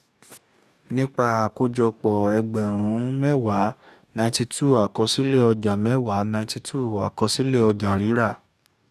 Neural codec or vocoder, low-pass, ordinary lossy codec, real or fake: codec, 44.1 kHz, 2.6 kbps, DAC; 14.4 kHz; none; fake